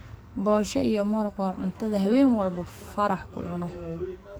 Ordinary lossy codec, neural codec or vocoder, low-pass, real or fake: none; codec, 44.1 kHz, 2.6 kbps, SNAC; none; fake